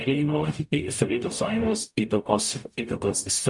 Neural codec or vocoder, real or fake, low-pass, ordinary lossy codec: codec, 44.1 kHz, 0.9 kbps, DAC; fake; 10.8 kHz; MP3, 96 kbps